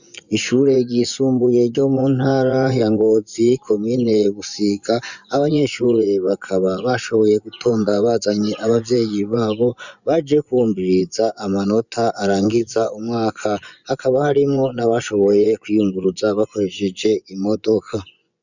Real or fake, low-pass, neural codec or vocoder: fake; 7.2 kHz; vocoder, 24 kHz, 100 mel bands, Vocos